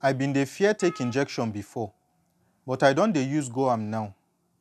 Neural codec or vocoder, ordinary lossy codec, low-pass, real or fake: none; none; 14.4 kHz; real